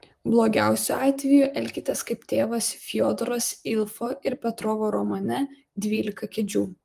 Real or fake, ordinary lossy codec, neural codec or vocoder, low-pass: fake; Opus, 32 kbps; vocoder, 44.1 kHz, 128 mel bands, Pupu-Vocoder; 14.4 kHz